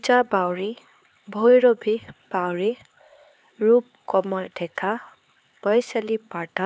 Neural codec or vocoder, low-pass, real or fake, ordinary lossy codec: codec, 16 kHz, 4 kbps, X-Codec, HuBERT features, trained on LibriSpeech; none; fake; none